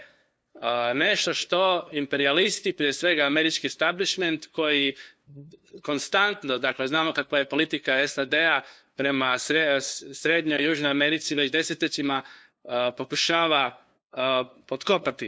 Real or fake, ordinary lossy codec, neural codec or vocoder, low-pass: fake; none; codec, 16 kHz, 2 kbps, FunCodec, trained on LibriTTS, 25 frames a second; none